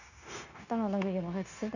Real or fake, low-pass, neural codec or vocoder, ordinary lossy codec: fake; 7.2 kHz; codec, 16 kHz, 0.9 kbps, LongCat-Audio-Codec; none